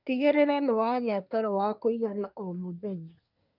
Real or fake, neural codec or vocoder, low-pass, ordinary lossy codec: fake; codec, 24 kHz, 1 kbps, SNAC; 5.4 kHz; none